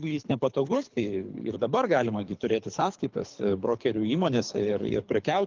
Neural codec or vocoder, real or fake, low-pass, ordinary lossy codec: codec, 24 kHz, 3 kbps, HILCodec; fake; 7.2 kHz; Opus, 32 kbps